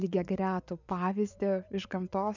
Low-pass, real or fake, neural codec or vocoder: 7.2 kHz; fake; vocoder, 24 kHz, 100 mel bands, Vocos